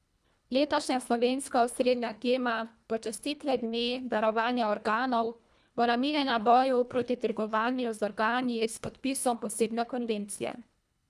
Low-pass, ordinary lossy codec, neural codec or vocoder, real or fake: none; none; codec, 24 kHz, 1.5 kbps, HILCodec; fake